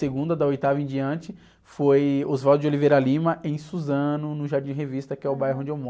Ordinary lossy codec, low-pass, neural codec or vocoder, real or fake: none; none; none; real